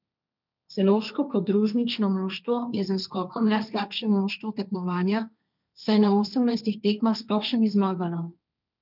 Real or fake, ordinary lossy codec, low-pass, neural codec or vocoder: fake; none; 5.4 kHz; codec, 16 kHz, 1.1 kbps, Voila-Tokenizer